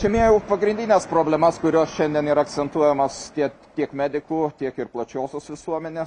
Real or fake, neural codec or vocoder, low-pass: real; none; 10.8 kHz